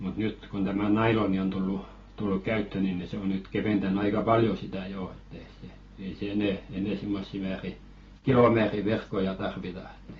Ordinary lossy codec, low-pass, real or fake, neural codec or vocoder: AAC, 24 kbps; 7.2 kHz; real; none